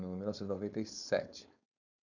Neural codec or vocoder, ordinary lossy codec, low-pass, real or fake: codec, 16 kHz, 4.8 kbps, FACodec; none; 7.2 kHz; fake